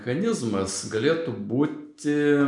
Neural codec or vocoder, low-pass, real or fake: none; 10.8 kHz; real